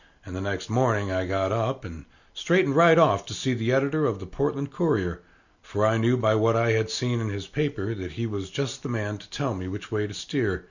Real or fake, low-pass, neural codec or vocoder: real; 7.2 kHz; none